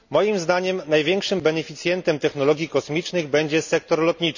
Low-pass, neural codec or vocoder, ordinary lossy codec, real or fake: 7.2 kHz; none; none; real